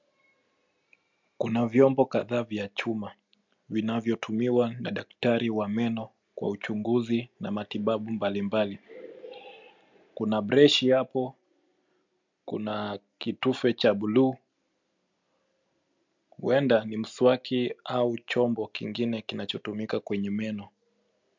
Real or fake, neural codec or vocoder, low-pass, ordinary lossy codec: real; none; 7.2 kHz; MP3, 64 kbps